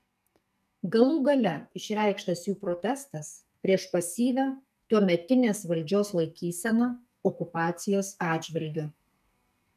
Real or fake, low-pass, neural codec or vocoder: fake; 14.4 kHz; codec, 44.1 kHz, 2.6 kbps, SNAC